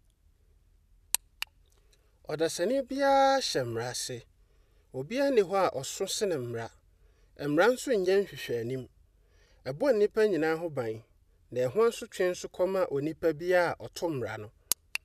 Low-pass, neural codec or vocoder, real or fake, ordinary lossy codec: 14.4 kHz; none; real; none